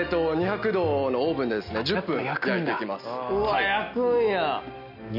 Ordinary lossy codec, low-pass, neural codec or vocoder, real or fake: none; 5.4 kHz; none; real